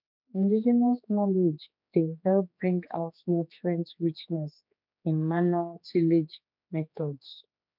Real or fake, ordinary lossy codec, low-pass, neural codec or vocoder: fake; MP3, 48 kbps; 5.4 kHz; codec, 16 kHz, 4 kbps, X-Codec, HuBERT features, trained on general audio